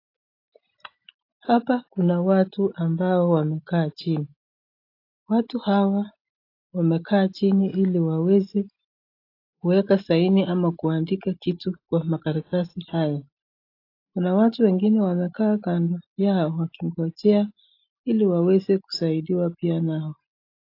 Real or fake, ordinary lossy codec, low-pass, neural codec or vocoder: real; AAC, 32 kbps; 5.4 kHz; none